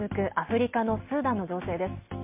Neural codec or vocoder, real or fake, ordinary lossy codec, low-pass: none; real; MP3, 32 kbps; 3.6 kHz